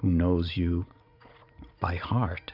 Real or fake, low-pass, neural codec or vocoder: fake; 5.4 kHz; vocoder, 44.1 kHz, 80 mel bands, Vocos